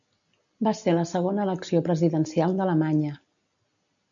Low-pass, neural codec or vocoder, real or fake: 7.2 kHz; none; real